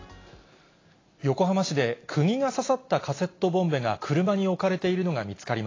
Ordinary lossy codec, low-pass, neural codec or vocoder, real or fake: AAC, 32 kbps; 7.2 kHz; none; real